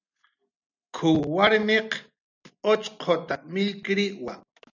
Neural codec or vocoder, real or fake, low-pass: none; real; 7.2 kHz